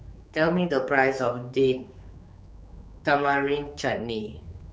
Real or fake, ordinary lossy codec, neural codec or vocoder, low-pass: fake; none; codec, 16 kHz, 4 kbps, X-Codec, HuBERT features, trained on general audio; none